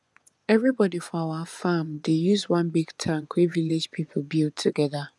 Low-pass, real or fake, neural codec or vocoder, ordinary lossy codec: none; fake; vocoder, 24 kHz, 100 mel bands, Vocos; none